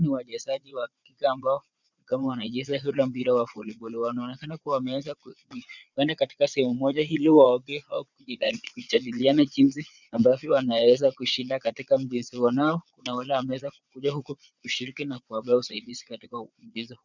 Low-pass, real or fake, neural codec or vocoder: 7.2 kHz; fake; vocoder, 22.05 kHz, 80 mel bands, Vocos